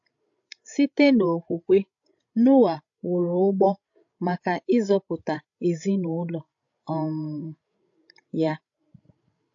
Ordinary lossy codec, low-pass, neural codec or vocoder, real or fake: AAC, 48 kbps; 7.2 kHz; codec, 16 kHz, 16 kbps, FreqCodec, larger model; fake